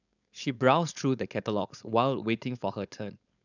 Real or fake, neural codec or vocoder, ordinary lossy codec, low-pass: fake; codec, 16 kHz, 4.8 kbps, FACodec; none; 7.2 kHz